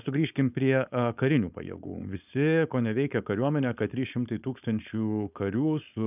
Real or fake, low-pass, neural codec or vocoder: fake; 3.6 kHz; codec, 16 kHz, 4.8 kbps, FACodec